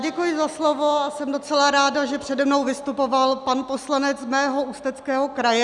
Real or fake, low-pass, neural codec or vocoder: real; 10.8 kHz; none